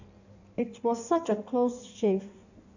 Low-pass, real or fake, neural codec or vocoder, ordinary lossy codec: 7.2 kHz; fake; codec, 16 kHz in and 24 kHz out, 1.1 kbps, FireRedTTS-2 codec; none